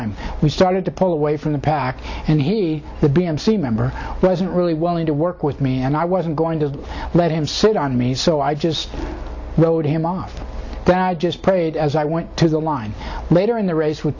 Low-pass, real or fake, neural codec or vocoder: 7.2 kHz; real; none